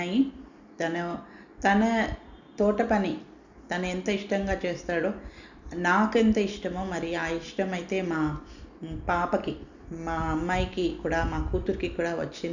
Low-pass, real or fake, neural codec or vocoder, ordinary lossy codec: 7.2 kHz; real; none; none